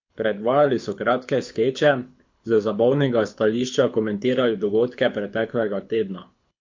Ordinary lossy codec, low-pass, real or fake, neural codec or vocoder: MP3, 48 kbps; 7.2 kHz; fake; codec, 24 kHz, 6 kbps, HILCodec